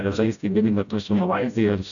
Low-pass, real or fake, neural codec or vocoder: 7.2 kHz; fake; codec, 16 kHz, 0.5 kbps, FreqCodec, smaller model